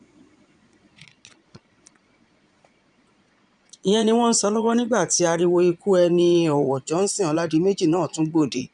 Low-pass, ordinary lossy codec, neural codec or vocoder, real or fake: 9.9 kHz; none; vocoder, 22.05 kHz, 80 mel bands, Vocos; fake